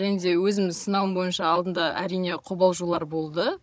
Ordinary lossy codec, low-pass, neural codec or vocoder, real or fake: none; none; codec, 16 kHz, 16 kbps, FunCodec, trained on Chinese and English, 50 frames a second; fake